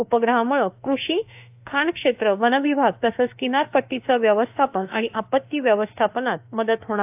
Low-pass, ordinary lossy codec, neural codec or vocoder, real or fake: 3.6 kHz; AAC, 32 kbps; autoencoder, 48 kHz, 32 numbers a frame, DAC-VAE, trained on Japanese speech; fake